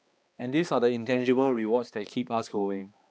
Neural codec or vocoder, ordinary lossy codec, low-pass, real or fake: codec, 16 kHz, 2 kbps, X-Codec, HuBERT features, trained on balanced general audio; none; none; fake